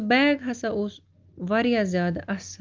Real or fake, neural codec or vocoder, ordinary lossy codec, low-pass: real; none; Opus, 24 kbps; 7.2 kHz